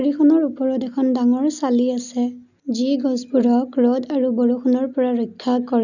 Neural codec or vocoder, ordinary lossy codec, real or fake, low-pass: none; none; real; 7.2 kHz